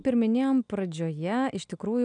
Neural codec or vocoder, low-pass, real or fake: none; 9.9 kHz; real